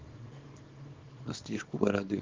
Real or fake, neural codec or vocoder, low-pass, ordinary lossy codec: fake; codec, 24 kHz, 0.9 kbps, WavTokenizer, small release; 7.2 kHz; Opus, 16 kbps